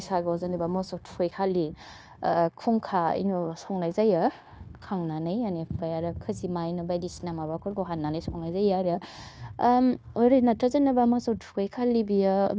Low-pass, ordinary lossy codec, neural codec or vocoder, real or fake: none; none; codec, 16 kHz, 0.9 kbps, LongCat-Audio-Codec; fake